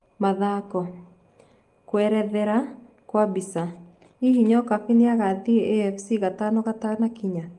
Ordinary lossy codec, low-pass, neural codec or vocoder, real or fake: Opus, 24 kbps; 10.8 kHz; none; real